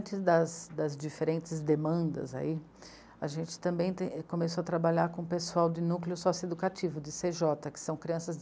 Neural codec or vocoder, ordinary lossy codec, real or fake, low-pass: none; none; real; none